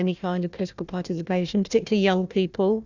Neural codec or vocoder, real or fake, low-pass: codec, 16 kHz, 1 kbps, FunCodec, trained on Chinese and English, 50 frames a second; fake; 7.2 kHz